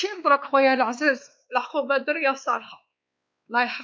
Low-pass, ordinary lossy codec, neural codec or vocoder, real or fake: none; none; codec, 16 kHz, 2 kbps, X-Codec, WavLM features, trained on Multilingual LibriSpeech; fake